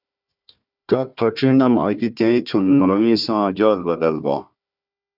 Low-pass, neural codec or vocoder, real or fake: 5.4 kHz; codec, 16 kHz, 1 kbps, FunCodec, trained on Chinese and English, 50 frames a second; fake